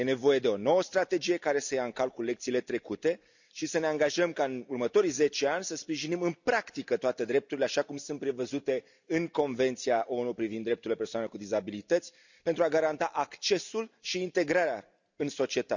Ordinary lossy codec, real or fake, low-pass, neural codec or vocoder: none; real; 7.2 kHz; none